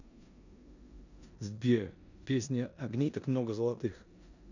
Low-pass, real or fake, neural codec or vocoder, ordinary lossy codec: 7.2 kHz; fake; codec, 16 kHz in and 24 kHz out, 0.9 kbps, LongCat-Audio-Codec, four codebook decoder; none